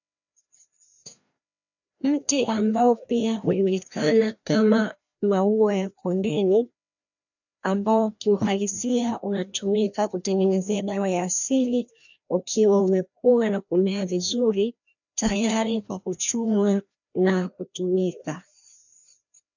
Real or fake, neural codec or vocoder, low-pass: fake; codec, 16 kHz, 1 kbps, FreqCodec, larger model; 7.2 kHz